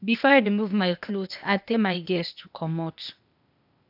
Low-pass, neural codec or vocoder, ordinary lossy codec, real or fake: 5.4 kHz; codec, 16 kHz, 0.8 kbps, ZipCodec; none; fake